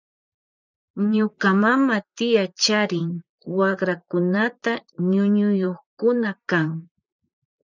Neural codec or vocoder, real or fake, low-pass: vocoder, 44.1 kHz, 128 mel bands, Pupu-Vocoder; fake; 7.2 kHz